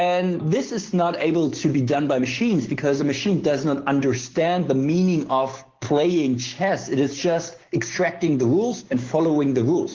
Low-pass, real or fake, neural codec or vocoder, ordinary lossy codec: 7.2 kHz; fake; codec, 16 kHz, 6 kbps, DAC; Opus, 16 kbps